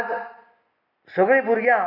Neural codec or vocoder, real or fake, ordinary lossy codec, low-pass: none; real; none; 5.4 kHz